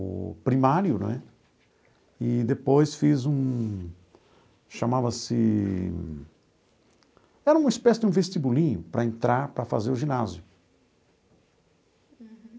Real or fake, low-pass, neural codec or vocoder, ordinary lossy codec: real; none; none; none